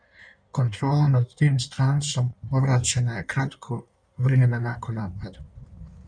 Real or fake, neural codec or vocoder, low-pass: fake; codec, 16 kHz in and 24 kHz out, 1.1 kbps, FireRedTTS-2 codec; 9.9 kHz